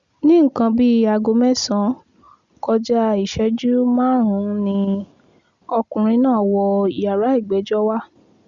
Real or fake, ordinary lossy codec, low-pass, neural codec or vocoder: real; Opus, 64 kbps; 7.2 kHz; none